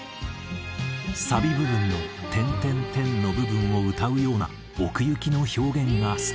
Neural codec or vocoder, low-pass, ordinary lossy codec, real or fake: none; none; none; real